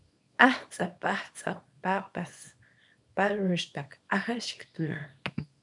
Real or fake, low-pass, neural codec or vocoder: fake; 10.8 kHz; codec, 24 kHz, 0.9 kbps, WavTokenizer, small release